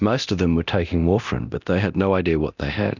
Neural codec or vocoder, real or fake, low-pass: codec, 24 kHz, 0.9 kbps, DualCodec; fake; 7.2 kHz